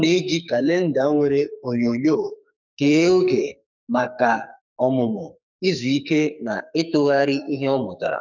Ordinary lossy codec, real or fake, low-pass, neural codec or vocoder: none; fake; 7.2 kHz; codec, 44.1 kHz, 2.6 kbps, SNAC